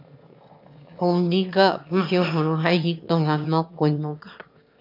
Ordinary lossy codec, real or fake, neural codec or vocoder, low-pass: MP3, 48 kbps; fake; autoencoder, 22.05 kHz, a latent of 192 numbers a frame, VITS, trained on one speaker; 5.4 kHz